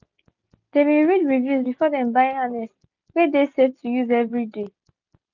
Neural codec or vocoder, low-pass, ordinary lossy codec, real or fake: none; 7.2 kHz; none; real